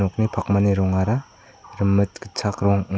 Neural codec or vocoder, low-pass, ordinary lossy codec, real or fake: none; none; none; real